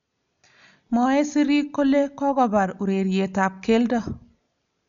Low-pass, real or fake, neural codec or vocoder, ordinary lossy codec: 7.2 kHz; real; none; none